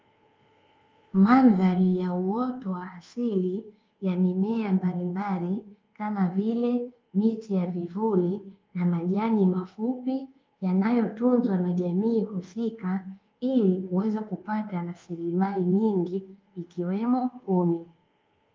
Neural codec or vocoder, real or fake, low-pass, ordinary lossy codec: codec, 24 kHz, 1.2 kbps, DualCodec; fake; 7.2 kHz; Opus, 32 kbps